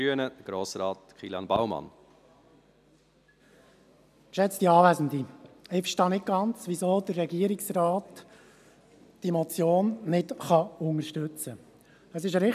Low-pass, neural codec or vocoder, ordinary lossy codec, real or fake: 14.4 kHz; none; none; real